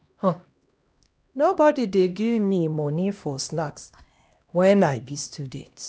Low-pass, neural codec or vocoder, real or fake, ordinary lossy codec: none; codec, 16 kHz, 1 kbps, X-Codec, HuBERT features, trained on LibriSpeech; fake; none